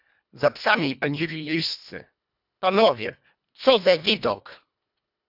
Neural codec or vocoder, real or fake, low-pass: codec, 24 kHz, 1.5 kbps, HILCodec; fake; 5.4 kHz